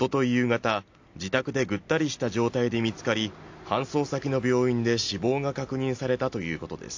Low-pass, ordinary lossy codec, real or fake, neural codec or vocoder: 7.2 kHz; AAC, 48 kbps; real; none